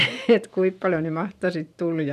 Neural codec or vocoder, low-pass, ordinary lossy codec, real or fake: vocoder, 44.1 kHz, 128 mel bands every 512 samples, BigVGAN v2; 14.4 kHz; none; fake